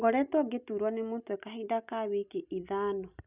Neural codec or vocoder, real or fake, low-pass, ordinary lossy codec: none; real; 3.6 kHz; none